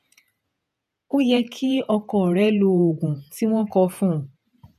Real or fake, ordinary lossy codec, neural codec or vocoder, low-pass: fake; none; vocoder, 48 kHz, 128 mel bands, Vocos; 14.4 kHz